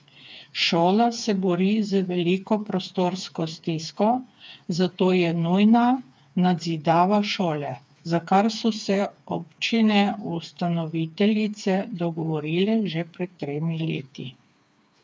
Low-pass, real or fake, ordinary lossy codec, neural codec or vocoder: none; fake; none; codec, 16 kHz, 4 kbps, FreqCodec, smaller model